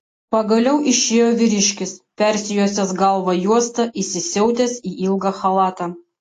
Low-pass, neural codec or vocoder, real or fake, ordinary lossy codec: 14.4 kHz; none; real; AAC, 48 kbps